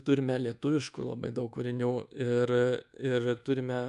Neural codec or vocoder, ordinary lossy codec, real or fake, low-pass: codec, 24 kHz, 1.2 kbps, DualCodec; AAC, 96 kbps; fake; 10.8 kHz